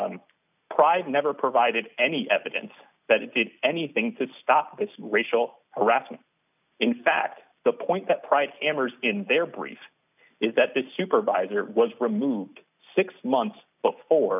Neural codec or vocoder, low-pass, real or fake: none; 3.6 kHz; real